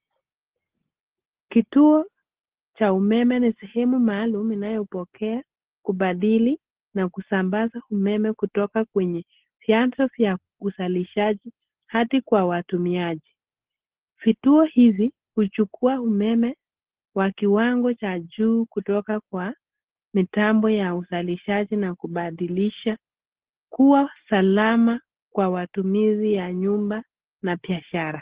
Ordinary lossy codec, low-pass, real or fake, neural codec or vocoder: Opus, 16 kbps; 3.6 kHz; real; none